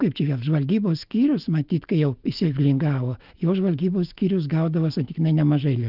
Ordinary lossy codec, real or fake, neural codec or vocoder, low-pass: Opus, 32 kbps; fake; autoencoder, 48 kHz, 128 numbers a frame, DAC-VAE, trained on Japanese speech; 5.4 kHz